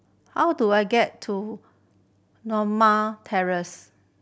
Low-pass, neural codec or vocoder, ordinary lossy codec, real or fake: none; none; none; real